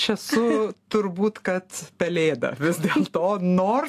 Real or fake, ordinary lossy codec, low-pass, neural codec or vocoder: real; AAC, 64 kbps; 14.4 kHz; none